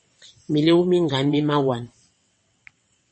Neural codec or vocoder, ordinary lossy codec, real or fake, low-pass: vocoder, 22.05 kHz, 80 mel bands, WaveNeXt; MP3, 32 kbps; fake; 9.9 kHz